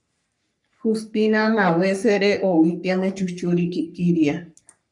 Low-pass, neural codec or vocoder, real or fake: 10.8 kHz; codec, 44.1 kHz, 3.4 kbps, Pupu-Codec; fake